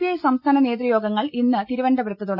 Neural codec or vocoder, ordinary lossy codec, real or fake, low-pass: none; none; real; 5.4 kHz